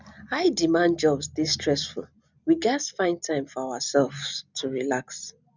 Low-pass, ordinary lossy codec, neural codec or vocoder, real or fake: 7.2 kHz; none; none; real